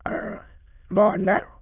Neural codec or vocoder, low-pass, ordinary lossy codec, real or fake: autoencoder, 22.05 kHz, a latent of 192 numbers a frame, VITS, trained on many speakers; 3.6 kHz; none; fake